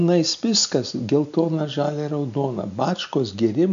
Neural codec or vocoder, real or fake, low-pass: none; real; 7.2 kHz